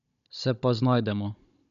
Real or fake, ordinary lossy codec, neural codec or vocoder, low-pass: fake; none; codec, 16 kHz, 16 kbps, FunCodec, trained on Chinese and English, 50 frames a second; 7.2 kHz